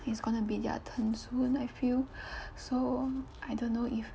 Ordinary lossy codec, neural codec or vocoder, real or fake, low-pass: none; none; real; none